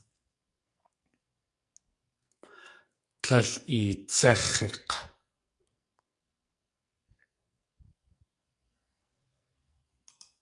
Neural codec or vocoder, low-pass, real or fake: codec, 44.1 kHz, 2.6 kbps, SNAC; 10.8 kHz; fake